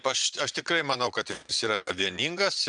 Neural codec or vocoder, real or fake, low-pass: vocoder, 22.05 kHz, 80 mel bands, Vocos; fake; 9.9 kHz